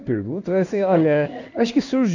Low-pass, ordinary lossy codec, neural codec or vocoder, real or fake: 7.2 kHz; none; codec, 16 kHz in and 24 kHz out, 1 kbps, XY-Tokenizer; fake